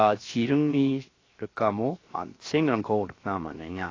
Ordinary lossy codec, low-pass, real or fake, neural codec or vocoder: AAC, 32 kbps; 7.2 kHz; fake; codec, 16 kHz, 0.7 kbps, FocalCodec